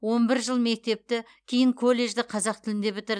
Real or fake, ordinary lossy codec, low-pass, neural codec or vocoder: real; none; 9.9 kHz; none